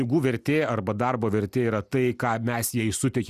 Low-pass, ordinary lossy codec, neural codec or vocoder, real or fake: 14.4 kHz; Opus, 64 kbps; none; real